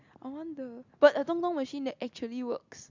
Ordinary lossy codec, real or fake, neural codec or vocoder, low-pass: MP3, 64 kbps; real; none; 7.2 kHz